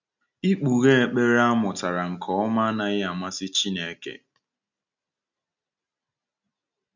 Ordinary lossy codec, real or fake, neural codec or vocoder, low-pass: none; real; none; 7.2 kHz